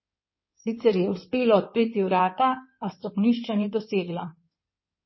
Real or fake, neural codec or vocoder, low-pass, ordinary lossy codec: fake; codec, 16 kHz in and 24 kHz out, 2.2 kbps, FireRedTTS-2 codec; 7.2 kHz; MP3, 24 kbps